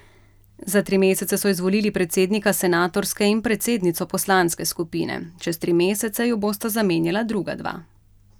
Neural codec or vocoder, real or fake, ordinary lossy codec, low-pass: none; real; none; none